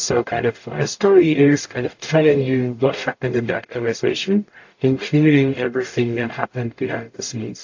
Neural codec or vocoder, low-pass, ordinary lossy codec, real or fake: codec, 44.1 kHz, 0.9 kbps, DAC; 7.2 kHz; AAC, 48 kbps; fake